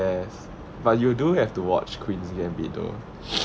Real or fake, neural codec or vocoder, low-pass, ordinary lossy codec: real; none; none; none